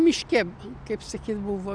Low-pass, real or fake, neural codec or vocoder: 9.9 kHz; real; none